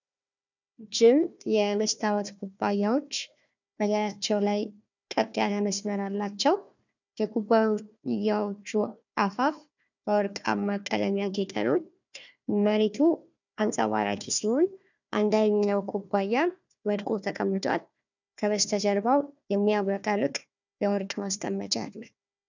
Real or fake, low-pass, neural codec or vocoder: fake; 7.2 kHz; codec, 16 kHz, 1 kbps, FunCodec, trained on Chinese and English, 50 frames a second